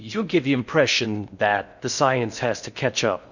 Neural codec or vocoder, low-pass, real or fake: codec, 16 kHz in and 24 kHz out, 0.6 kbps, FocalCodec, streaming, 4096 codes; 7.2 kHz; fake